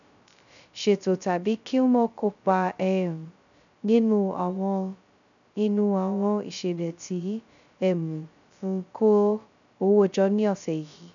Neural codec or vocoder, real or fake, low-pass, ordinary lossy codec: codec, 16 kHz, 0.2 kbps, FocalCodec; fake; 7.2 kHz; none